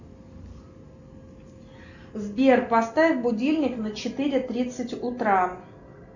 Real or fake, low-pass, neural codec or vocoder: real; 7.2 kHz; none